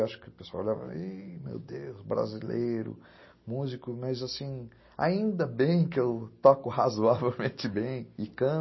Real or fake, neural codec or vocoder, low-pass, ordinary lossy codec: real; none; 7.2 kHz; MP3, 24 kbps